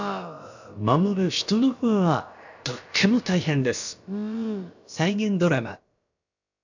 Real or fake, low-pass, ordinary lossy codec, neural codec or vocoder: fake; 7.2 kHz; none; codec, 16 kHz, about 1 kbps, DyCAST, with the encoder's durations